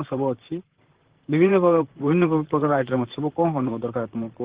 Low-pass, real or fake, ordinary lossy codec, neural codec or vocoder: 3.6 kHz; fake; Opus, 16 kbps; vocoder, 44.1 kHz, 128 mel bands, Pupu-Vocoder